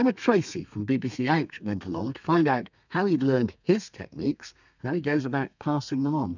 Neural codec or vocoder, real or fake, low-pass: codec, 32 kHz, 1.9 kbps, SNAC; fake; 7.2 kHz